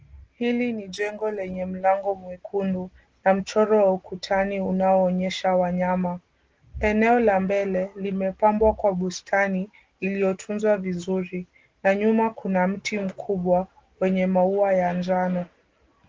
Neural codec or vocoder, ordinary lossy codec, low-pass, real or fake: none; Opus, 16 kbps; 7.2 kHz; real